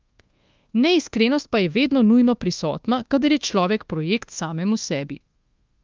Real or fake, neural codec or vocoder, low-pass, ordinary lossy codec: fake; codec, 24 kHz, 1.2 kbps, DualCodec; 7.2 kHz; Opus, 32 kbps